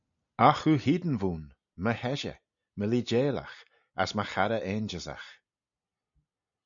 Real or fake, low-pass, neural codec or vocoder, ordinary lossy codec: real; 7.2 kHz; none; MP3, 96 kbps